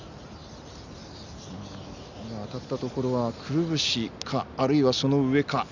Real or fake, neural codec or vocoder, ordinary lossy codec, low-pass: real; none; none; 7.2 kHz